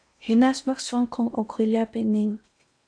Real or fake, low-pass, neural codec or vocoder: fake; 9.9 kHz; codec, 16 kHz in and 24 kHz out, 0.8 kbps, FocalCodec, streaming, 65536 codes